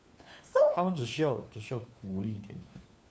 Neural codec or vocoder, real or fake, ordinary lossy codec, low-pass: codec, 16 kHz, 4 kbps, FunCodec, trained on LibriTTS, 50 frames a second; fake; none; none